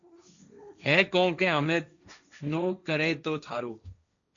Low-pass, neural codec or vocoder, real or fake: 7.2 kHz; codec, 16 kHz, 1.1 kbps, Voila-Tokenizer; fake